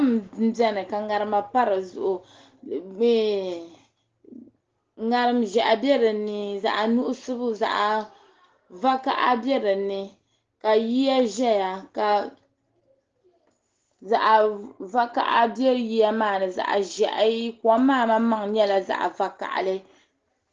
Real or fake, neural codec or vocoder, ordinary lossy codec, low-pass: real; none; Opus, 16 kbps; 7.2 kHz